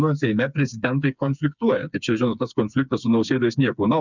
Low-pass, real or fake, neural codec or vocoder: 7.2 kHz; fake; codec, 16 kHz, 4 kbps, FreqCodec, smaller model